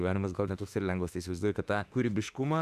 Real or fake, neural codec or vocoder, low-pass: fake; autoencoder, 48 kHz, 32 numbers a frame, DAC-VAE, trained on Japanese speech; 14.4 kHz